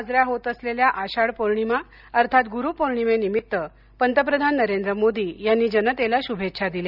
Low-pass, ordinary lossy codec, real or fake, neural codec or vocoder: 5.4 kHz; none; real; none